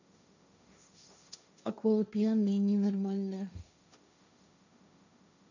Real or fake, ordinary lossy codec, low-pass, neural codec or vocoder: fake; none; 7.2 kHz; codec, 16 kHz, 1.1 kbps, Voila-Tokenizer